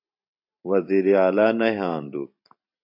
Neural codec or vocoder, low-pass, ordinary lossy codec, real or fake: vocoder, 44.1 kHz, 128 mel bands every 512 samples, BigVGAN v2; 5.4 kHz; AAC, 48 kbps; fake